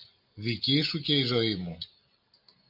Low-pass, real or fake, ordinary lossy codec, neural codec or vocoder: 5.4 kHz; real; MP3, 48 kbps; none